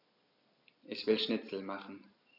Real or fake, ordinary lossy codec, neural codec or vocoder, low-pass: real; none; none; 5.4 kHz